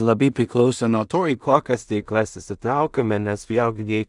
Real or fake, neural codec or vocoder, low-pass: fake; codec, 16 kHz in and 24 kHz out, 0.4 kbps, LongCat-Audio-Codec, two codebook decoder; 10.8 kHz